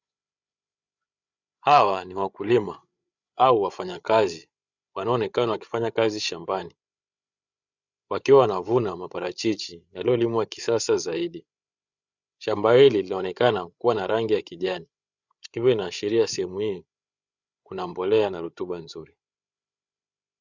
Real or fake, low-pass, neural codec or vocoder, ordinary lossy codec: fake; 7.2 kHz; codec, 16 kHz, 8 kbps, FreqCodec, larger model; Opus, 64 kbps